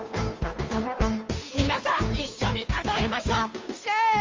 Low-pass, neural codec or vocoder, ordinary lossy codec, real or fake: 7.2 kHz; codec, 16 kHz, 2 kbps, FunCodec, trained on Chinese and English, 25 frames a second; Opus, 32 kbps; fake